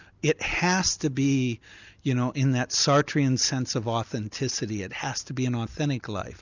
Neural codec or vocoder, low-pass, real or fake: none; 7.2 kHz; real